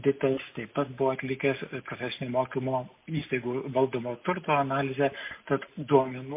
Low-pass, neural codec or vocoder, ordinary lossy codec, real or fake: 3.6 kHz; none; MP3, 24 kbps; real